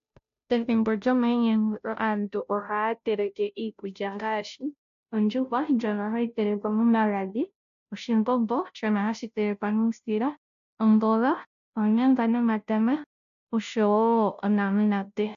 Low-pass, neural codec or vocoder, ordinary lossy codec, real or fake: 7.2 kHz; codec, 16 kHz, 0.5 kbps, FunCodec, trained on Chinese and English, 25 frames a second; AAC, 64 kbps; fake